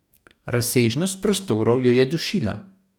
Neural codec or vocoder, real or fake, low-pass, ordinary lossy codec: codec, 44.1 kHz, 2.6 kbps, DAC; fake; 19.8 kHz; none